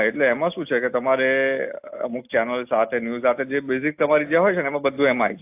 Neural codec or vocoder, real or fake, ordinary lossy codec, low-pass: none; real; AAC, 32 kbps; 3.6 kHz